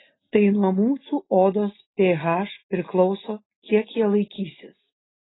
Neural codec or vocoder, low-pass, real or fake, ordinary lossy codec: none; 7.2 kHz; real; AAC, 16 kbps